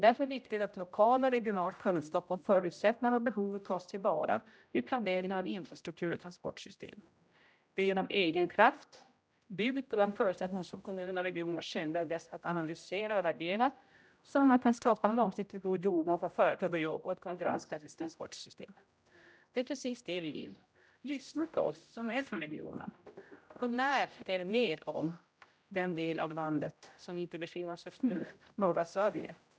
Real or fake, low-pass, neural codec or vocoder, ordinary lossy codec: fake; none; codec, 16 kHz, 0.5 kbps, X-Codec, HuBERT features, trained on general audio; none